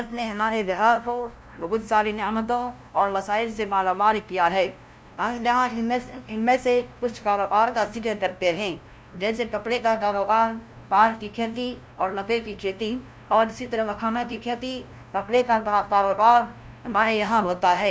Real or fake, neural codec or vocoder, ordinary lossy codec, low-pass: fake; codec, 16 kHz, 0.5 kbps, FunCodec, trained on LibriTTS, 25 frames a second; none; none